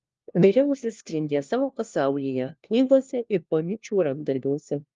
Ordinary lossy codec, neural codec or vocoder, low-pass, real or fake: Opus, 32 kbps; codec, 16 kHz, 1 kbps, FunCodec, trained on LibriTTS, 50 frames a second; 7.2 kHz; fake